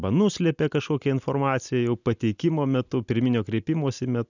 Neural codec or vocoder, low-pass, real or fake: none; 7.2 kHz; real